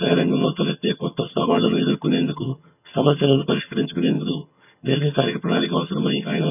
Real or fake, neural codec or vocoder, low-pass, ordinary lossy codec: fake; vocoder, 22.05 kHz, 80 mel bands, HiFi-GAN; 3.6 kHz; none